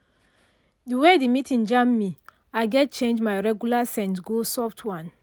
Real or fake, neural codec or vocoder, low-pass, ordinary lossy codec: real; none; none; none